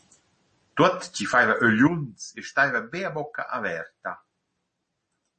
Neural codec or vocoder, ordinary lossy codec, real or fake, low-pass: none; MP3, 32 kbps; real; 10.8 kHz